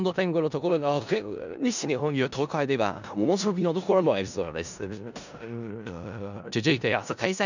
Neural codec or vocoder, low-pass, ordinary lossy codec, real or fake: codec, 16 kHz in and 24 kHz out, 0.4 kbps, LongCat-Audio-Codec, four codebook decoder; 7.2 kHz; none; fake